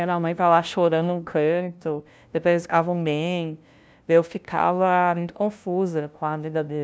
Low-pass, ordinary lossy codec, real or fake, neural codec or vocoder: none; none; fake; codec, 16 kHz, 0.5 kbps, FunCodec, trained on LibriTTS, 25 frames a second